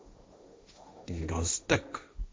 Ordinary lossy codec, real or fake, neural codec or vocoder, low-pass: none; fake; codec, 16 kHz, 1.1 kbps, Voila-Tokenizer; none